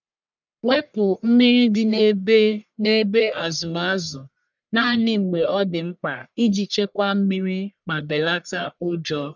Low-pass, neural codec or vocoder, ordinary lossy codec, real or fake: 7.2 kHz; codec, 44.1 kHz, 1.7 kbps, Pupu-Codec; none; fake